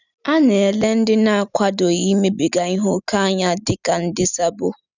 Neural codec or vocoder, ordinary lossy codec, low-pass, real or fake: none; none; 7.2 kHz; real